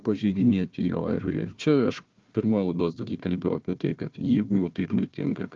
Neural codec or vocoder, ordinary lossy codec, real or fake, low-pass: codec, 16 kHz, 1 kbps, FunCodec, trained on Chinese and English, 50 frames a second; Opus, 24 kbps; fake; 7.2 kHz